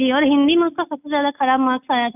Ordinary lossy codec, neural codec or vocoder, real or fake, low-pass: none; none; real; 3.6 kHz